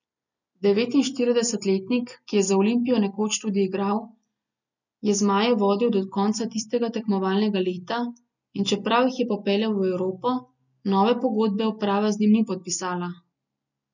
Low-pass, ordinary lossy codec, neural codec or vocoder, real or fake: 7.2 kHz; none; none; real